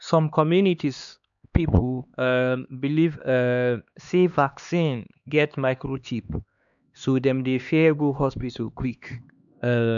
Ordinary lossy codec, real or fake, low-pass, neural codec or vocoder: none; fake; 7.2 kHz; codec, 16 kHz, 2 kbps, X-Codec, HuBERT features, trained on LibriSpeech